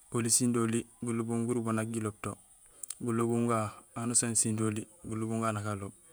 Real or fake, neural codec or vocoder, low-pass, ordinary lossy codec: fake; vocoder, 48 kHz, 128 mel bands, Vocos; none; none